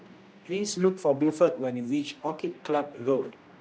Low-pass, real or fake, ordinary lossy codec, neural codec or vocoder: none; fake; none; codec, 16 kHz, 1 kbps, X-Codec, HuBERT features, trained on general audio